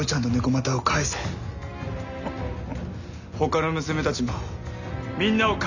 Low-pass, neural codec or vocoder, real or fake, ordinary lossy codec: 7.2 kHz; none; real; none